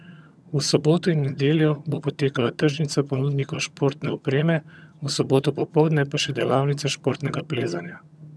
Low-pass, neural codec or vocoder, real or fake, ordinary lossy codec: none; vocoder, 22.05 kHz, 80 mel bands, HiFi-GAN; fake; none